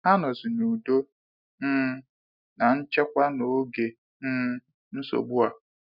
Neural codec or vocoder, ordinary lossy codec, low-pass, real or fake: none; none; 5.4 kHz; real